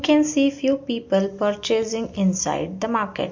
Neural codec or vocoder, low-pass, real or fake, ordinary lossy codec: none; 7.2 kHz; real; MP3, 48 kbps